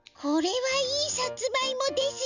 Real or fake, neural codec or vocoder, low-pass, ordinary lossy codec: real; none; 7.2 kHz; none